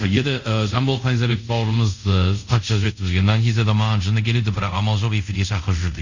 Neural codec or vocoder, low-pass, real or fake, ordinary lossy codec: codec, 24 kHz, 0.5 kbps, DualCodec; 7.2 kHz; fake; none